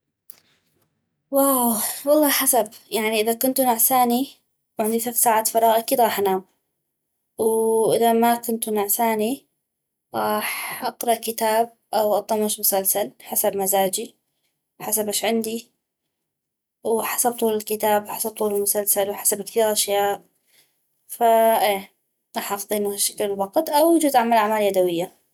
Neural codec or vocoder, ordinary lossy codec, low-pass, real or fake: none; none; none; real